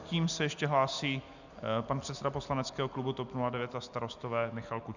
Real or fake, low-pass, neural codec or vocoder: real; 7.2 kHz; none